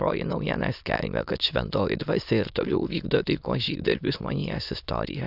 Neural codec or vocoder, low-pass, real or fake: autoencoder, 22.05 kHz, a latent of 192 numbers a frame, VITS, trained on many speakers; 5.4 kHz; fake